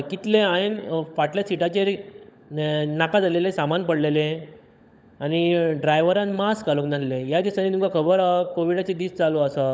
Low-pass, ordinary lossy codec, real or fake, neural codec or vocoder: none; none; fake; codec, 16 kHz, 16 kbps, FunCodec, trained on LibriTTS, 50 frames a second